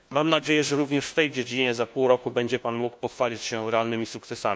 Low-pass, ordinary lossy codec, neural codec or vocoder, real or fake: none; none; codec, 16 kHz, 1 kbps, FunCodec, trained on LibriTTS, 50 frames a second; fake